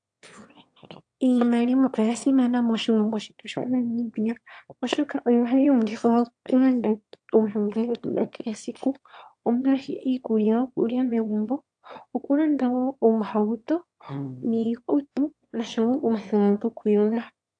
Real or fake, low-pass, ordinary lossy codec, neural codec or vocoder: fake; 9.9 kHz; AAC, 64 kbps; autoencoder, 22.05 kHz, a latent of 192 numbers a frame, VITS, trained on one speaker